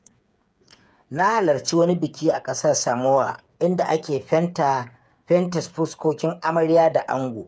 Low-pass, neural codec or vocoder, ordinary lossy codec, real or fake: none; codec, 16 kHz, 8 kbps, FreqCodec, smaller model; none; fake